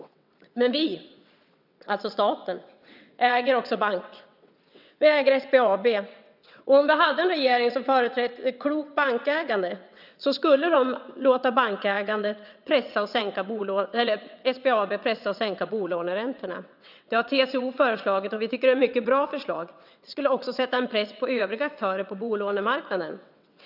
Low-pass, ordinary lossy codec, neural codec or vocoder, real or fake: 5.4 kHz; none; vocoder, 22.05 kHz, 80 mel bands, WaveNeXt; fake